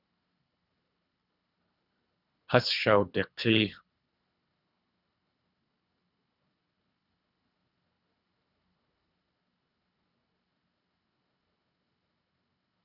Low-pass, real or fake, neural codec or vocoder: 5.4 kHz; fake; codec, 24 kHz, 3 kbps, HILCodec